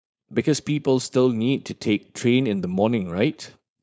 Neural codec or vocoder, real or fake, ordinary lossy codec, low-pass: codec, 16 kHz, 4.8 kbps, FACodec; fake; none; none